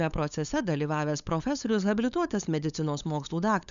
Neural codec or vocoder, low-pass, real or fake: codec, 16 kHz, 8 kbps, FunCodec, trained on LibriTTS, 25 frames a second; 7.2 kHz; fake